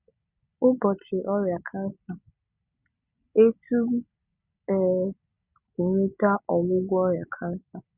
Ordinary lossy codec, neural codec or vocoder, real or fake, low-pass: Opus, 64 kbps; none; real; 3.6 kHz